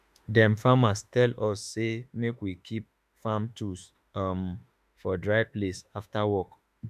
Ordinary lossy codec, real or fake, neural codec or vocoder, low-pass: none; fake; autoencoder, 48 kHz, 32 numbers a frame, DAC-VAE, trained on Japanese speech; 14.4 kHz